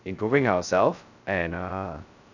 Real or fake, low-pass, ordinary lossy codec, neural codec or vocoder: fake; 7.2 kHz; none; codec, 16 kHz, 0.2 kbps, FocalCodec